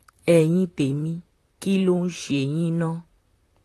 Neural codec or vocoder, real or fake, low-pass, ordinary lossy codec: vocoder, 44.1 kHz, 128 mel bands, Pupu-Vocoder; fake; 14.4 kHz; AAC, 48 kbps